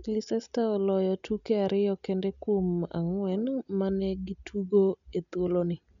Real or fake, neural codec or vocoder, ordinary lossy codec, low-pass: real; none; none; 7.2 kHz